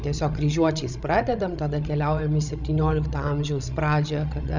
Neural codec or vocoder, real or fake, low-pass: codec, 16 kHz, 16 kbps, FreqCodec, larger model; fake; 7.2 kHz